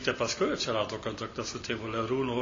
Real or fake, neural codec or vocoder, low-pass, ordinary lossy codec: real; none; 7.2 kHz; MP3, 32 kbps